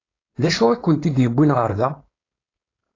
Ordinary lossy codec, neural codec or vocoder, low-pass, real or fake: AAC, 32 kbps; codec, 16 kHz, 4.8 kbps, FACodec; 7.2 kHz; fake